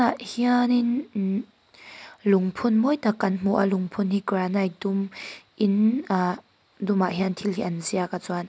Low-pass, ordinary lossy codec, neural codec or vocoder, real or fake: none; none; none; real